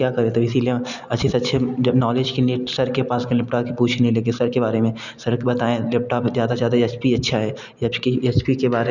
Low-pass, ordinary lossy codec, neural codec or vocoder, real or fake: 7.2 kHz; none; none; real